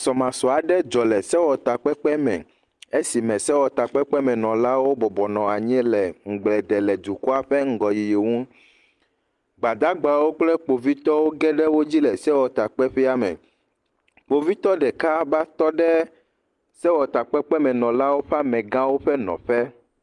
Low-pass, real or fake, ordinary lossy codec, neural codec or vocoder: 10.8 kHz; real; Opus, 32 kbps; none